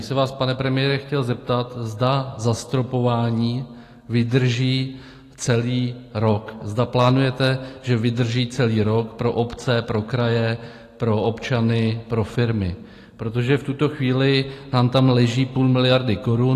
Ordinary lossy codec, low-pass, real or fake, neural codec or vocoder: AAC, 48 kbps; 14.4 kHz; real; none